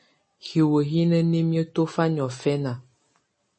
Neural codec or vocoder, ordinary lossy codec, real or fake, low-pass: none; MP3, 32 kbps; real; 9.9 kHz